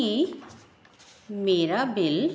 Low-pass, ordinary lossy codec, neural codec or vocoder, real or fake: none; none; none; real